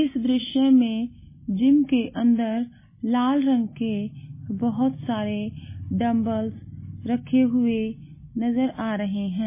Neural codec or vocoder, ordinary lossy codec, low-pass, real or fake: none; MP3, 16 kbps; 3.6 kHz; real